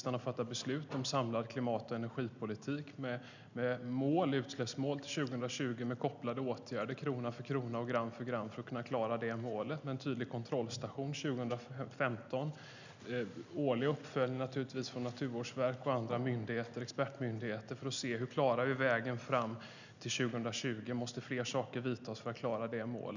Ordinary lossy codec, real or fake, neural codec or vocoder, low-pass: none; real; none; 7.2 kHz